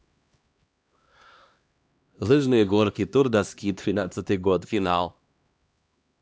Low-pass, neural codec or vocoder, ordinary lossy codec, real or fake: none; codec, 16 kHz, 1 kbps, X-Codec, HuBERT features, trained on LibriSpeech; none; fake